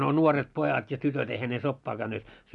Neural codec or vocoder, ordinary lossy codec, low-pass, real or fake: none; none; 10.8 kHz; real